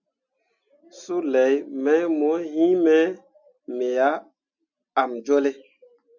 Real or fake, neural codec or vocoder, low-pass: real; none; 7.2 kHz